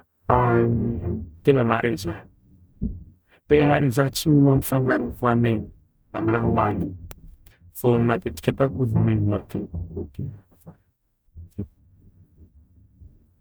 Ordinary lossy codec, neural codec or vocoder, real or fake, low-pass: none; codec, 44.1 kHz, 0.9 kbps, DAC; fake; none